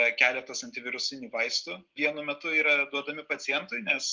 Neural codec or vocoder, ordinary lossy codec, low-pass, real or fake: none; Opus, 32 kbps; 7.2 kHz; real